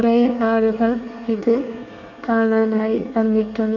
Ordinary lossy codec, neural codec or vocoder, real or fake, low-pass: none; codec, 24 kHz, 1 kbps, SNAC; fake; 7.2 kHz